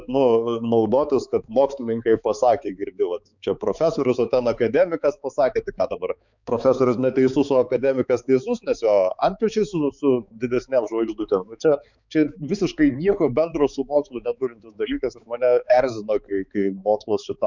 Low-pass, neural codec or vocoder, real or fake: 7.2 kHz; codec, 16 kHz, 4 kbps, X-Codec, HuBERT features, trained on balanced general audio; fake